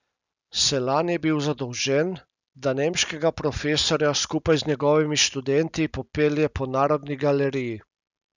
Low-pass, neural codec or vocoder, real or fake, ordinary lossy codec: 7.2 kHz; none; real; none